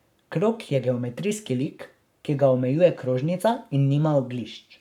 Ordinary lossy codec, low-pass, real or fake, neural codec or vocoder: none; 19.8 kHz; fake; codec, 44.1 kHz, 7.8 kbps, Pupu-Codec